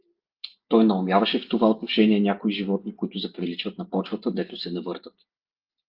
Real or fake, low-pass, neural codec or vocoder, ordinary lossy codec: real; 5.4 kHz; none; Opus, 32 kbps